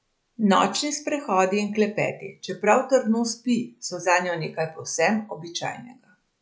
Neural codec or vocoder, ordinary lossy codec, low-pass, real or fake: none; none; none; real